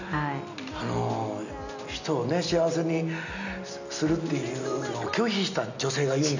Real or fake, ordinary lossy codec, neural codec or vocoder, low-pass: real; none; none; 7.2 kHz